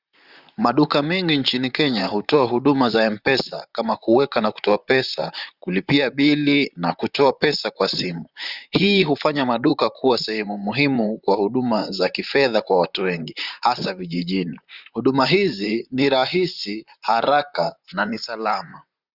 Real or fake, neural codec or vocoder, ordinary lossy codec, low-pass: fake; vocoder, 44.1 kHz, 128 mel bands, Pupu-Vocoder; Opus, 64 kbps; 5.4 kHz